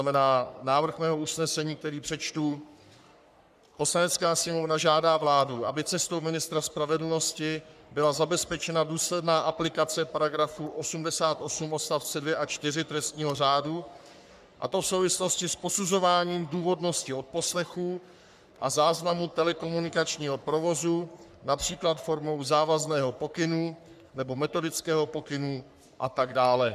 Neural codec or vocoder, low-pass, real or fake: codec, 44.1 kHz, 3.4 kbps, Pupu-Codec; 14.4 kHz; fake